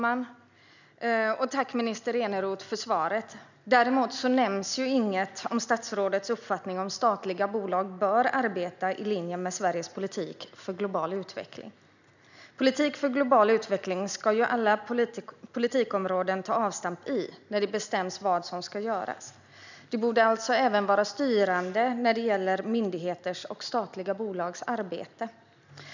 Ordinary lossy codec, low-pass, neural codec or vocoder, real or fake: none; 7.2 kHz; none; real